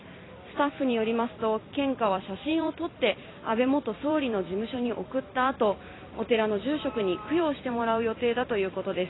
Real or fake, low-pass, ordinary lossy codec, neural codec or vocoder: fake; 7.2 kHz; AAC, 16 kbps; vocoder, 44.1 kHz, 128 mel bands every 256 samples, BigVGAN v2